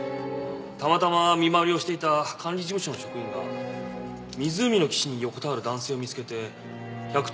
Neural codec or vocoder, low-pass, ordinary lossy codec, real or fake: none; none; none; real